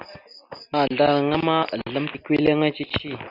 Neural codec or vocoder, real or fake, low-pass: none; real; 5.4 kHz